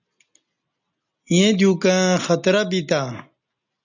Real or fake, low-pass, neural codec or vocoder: real; 7.2 kHz; none